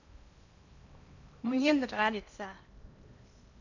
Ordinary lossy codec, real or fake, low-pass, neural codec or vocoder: none; fake; 7.2 kHz; codec, 16 kHz in and 24 kHz out, 0.8 kbps, FocalCodec, streaming, 65536 codes